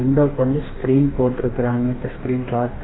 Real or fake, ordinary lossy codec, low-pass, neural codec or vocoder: fake; AAC, 16 kbps; 7.2 kHz; codec, 44.1 kHz, 2.6 kbps, DAC